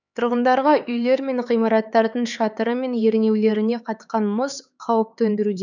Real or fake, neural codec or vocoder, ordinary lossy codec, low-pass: fake; codec, 16 kHz, 4 kbps, X-Codec, HuBERT features, trained on LibriSpeech; none; 7.2 kHz